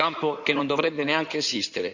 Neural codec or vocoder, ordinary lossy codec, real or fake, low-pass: codec, 16 kHz in and 24 kHz out, 2.2 kbps, FireRedTTS-2 codec; none; fake; 7.2 kHz